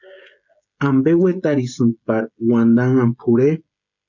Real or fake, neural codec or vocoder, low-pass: fake; codec, 16 kHz, 16 kbps, FreqCodec, smaller model; 7.2 kHz